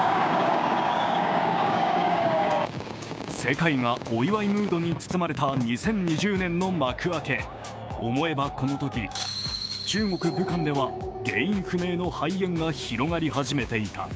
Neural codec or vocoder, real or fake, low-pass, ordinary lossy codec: codec, 16 kHz, 6 kbps, DAC; fake; none; none